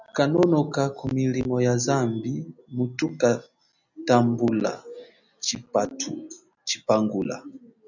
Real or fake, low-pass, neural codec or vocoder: real; 7.2 kHz; none